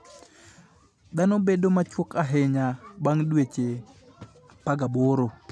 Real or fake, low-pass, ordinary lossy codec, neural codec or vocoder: real; none; none; none